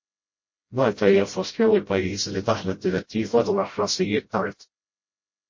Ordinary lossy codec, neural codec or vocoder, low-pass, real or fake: MP3, 32 kbps; codec, 16 kHz, 0.5 kbps, FreqCodec, smaller model; 7.2 kHz; fake